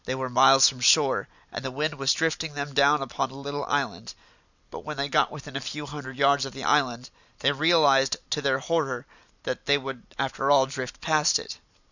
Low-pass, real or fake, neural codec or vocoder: 7.2 kHz; real; none